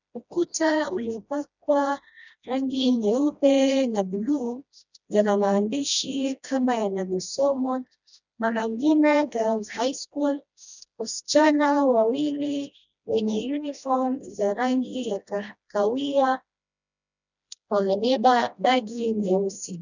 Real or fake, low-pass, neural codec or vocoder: fake; 7.2 kHz; codec, 16 kHz, 1 kbps, FreqCodec, smaller model